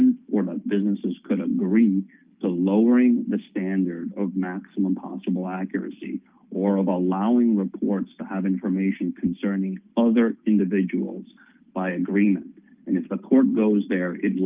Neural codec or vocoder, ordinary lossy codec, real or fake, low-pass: none; Opus, 32 kbps; real; 3.6 kHz